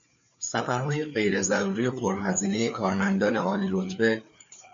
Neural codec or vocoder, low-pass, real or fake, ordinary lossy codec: codec, 16 kHz, 4 kbps, FreqCodec, larger model; 7.2 kHz; fake; AAC, 64 kbps